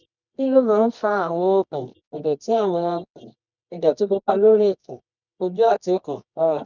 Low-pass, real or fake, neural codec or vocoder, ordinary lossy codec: 7.2 kHz; fake; codec, 24 kHz, 0.9 kbps, WavTokenizer, medium music audio release; none